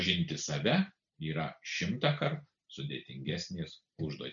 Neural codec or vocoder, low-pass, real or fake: none; 7.2 kHz; real